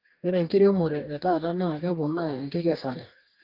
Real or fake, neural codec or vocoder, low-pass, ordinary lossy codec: fake; codec, 44.1 kHz, 2.6 kbps, DAC; 5.4 kHz; Opus, 24 kbps